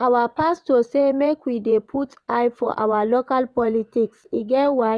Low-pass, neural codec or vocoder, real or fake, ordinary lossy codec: none; vocoder, 22.05 kHz, 80 mel bands, WaveNeXt; fake; none